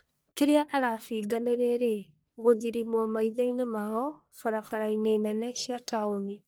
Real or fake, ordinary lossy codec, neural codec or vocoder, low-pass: fake; none; codec, 44.1 kHz, 1.7 kbps, Pupu-Codec; none